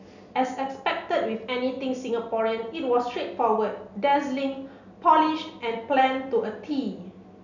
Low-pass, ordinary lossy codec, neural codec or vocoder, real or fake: 7.2 kHz; none; none; real